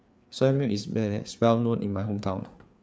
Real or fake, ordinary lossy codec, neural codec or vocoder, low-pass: fake; none; codec, 16 kHz, 4 kbps, FreqCodec, larger model; none